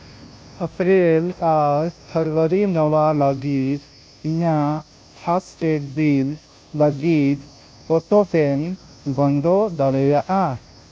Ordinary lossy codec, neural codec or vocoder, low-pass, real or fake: none; codec, 16 kHz, 0.5 kbps, FunCodec, trained on Chinese and English, 25 frames a second; none; fake